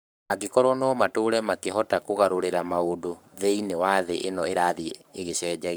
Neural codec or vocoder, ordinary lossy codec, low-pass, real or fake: codec, 44.1 kHz, 7.8 kbps, Pupu-Codec; none; none; fake